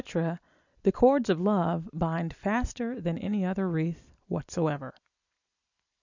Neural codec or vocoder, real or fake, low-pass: none; real; 7.2 kHz